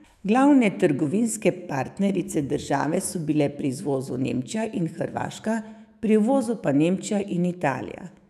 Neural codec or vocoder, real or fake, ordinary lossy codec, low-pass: autoencoder, 48 kHz, 128 numbers a frame, DAC-VAE, trained on Japanese speech; fake; none; 14.4 kHz